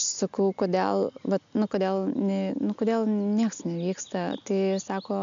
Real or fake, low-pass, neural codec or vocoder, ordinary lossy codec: real; 7.2 kHz; none; AAC, 96 kbps